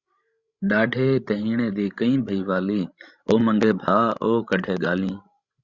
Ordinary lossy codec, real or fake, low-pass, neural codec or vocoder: Opus, 64 kbps; fake; 7.2 kHz; codec, 16 kHz, 16 kbps, FreqCodec, larger model